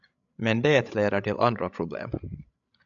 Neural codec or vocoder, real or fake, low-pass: codec, 16 kHz, 16 kbps, FreqCodec, larger model; fake; 7.2 kHz